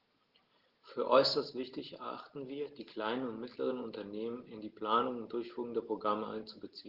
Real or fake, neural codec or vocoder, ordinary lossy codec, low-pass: real; none; Opus, 16 kbps; 5.4 kHz